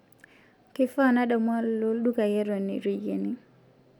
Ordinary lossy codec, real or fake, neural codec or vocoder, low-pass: none; real; none; 19.8 kHz